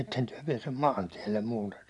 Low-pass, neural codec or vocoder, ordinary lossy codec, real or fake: none; none; none; real